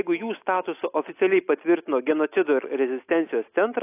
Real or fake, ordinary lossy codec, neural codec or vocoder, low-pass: real; AAC, 24 kbps; none; 3.6 kHz